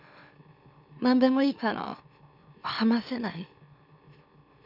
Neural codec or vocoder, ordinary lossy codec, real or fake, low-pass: autoencoder, 44.1 kHz, a latent of 192 numbers a frame, MeloTTS; none; fake; 5.4 kHz